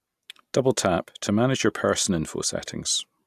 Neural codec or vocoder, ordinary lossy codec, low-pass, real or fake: none; AAC, 96 kbps; 14.4 kHz; real